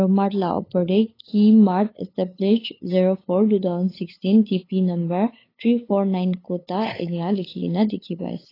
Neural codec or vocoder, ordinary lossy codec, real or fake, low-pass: codec, 16 kHz, 8 kbps, FunCodec, trained on LibriTTS, 25 frames a second; AAC, 24 kbps; fake; 5.4 kHz